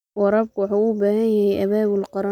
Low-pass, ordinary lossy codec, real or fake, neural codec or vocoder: 19.8 kHz; none; real; none